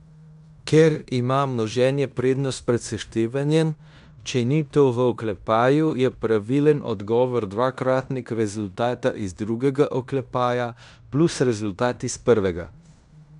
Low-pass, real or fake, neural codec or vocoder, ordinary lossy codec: 10.8 kHz; fake; codec, 16 kHz in and 24 kHz out, 0.9 kbps, LongCat-Audio-Codec, fine tuned four codebook decoder; none